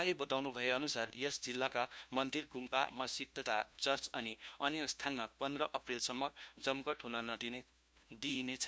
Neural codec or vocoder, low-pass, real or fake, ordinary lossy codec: codec, 16 kHz, 1 kbps, FunCodec, trained on LibriTTS, 50 frames a second; none; fake; none